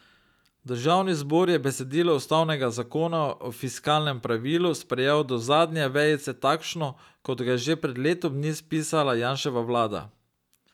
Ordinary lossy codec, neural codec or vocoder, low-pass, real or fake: none; none; 19.8 kHz; real